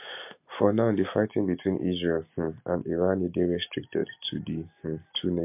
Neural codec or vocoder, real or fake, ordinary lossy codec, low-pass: none; real; MP3, 32 kbps; 3.6 kHz